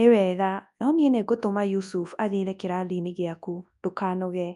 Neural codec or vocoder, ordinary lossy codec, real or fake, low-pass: codec, 24 kHz, 0.9 kbps, WavTokenizer, large speech release; none; fake; 10.8 kHz